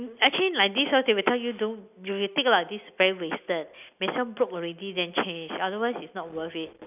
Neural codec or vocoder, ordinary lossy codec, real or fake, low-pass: none; none; real; 3.6 kHz